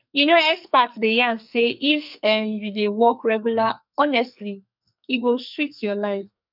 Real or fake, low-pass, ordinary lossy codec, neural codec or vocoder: fake; 5.4 kHz; none; codec, 44.1 kHz, 2.6 kbps, SNAC